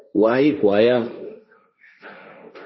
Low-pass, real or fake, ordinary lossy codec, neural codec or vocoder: 7.2 kHz; fake; MP3, 24 kbps; codec, 16 kHz, 1.1 kbps, Voila-Tokenizer